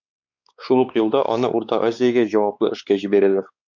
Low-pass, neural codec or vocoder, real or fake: 7.2 kHz; codec, 16 kHz, 4 kbps, X-Codec, WavLM features, trained on Multilingual LibriSpeech; fake